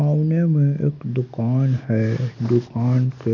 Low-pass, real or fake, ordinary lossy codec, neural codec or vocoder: 7.2 kHz; real; none; none